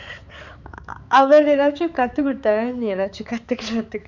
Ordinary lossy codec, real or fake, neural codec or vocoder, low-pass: none; fake; codec, 16 kHz, 4 kbps, X-Codec, HuBERT features, trained on balanced general audio; 7.2 kHz